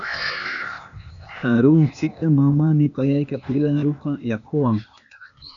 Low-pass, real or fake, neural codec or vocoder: 7.2 kHz; fake; codec, 16 kHz, 0.8 kbps, ZipCodec